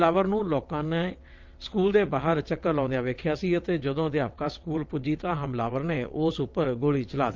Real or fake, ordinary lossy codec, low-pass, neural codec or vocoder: fake; Opus, 24 kbps; 7.2 kHz; vocoder, 22.05 kHz, 80 mel bands, WaveNeXt